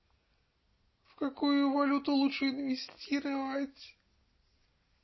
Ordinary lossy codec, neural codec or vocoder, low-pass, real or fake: MP3, 24 kbps; none; 7.2 kHz; real